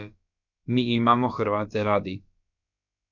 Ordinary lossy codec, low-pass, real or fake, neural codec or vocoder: none; 7.2 kHz; fake; codec, 16 kHz, about 1 kbps, DyCAST, with the encoder's durations